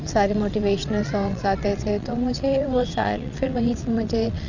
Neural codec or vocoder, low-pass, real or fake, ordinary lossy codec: vocoder, 22.05 kHz, 80 mel bands, WaveNeXt; 7.2 kHz; fake; none